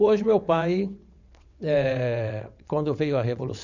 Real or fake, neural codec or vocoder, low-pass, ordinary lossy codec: fake; vocoder, 22.05 kHz, 80 mel bands, WaveNeXt; 7.2 kHz; none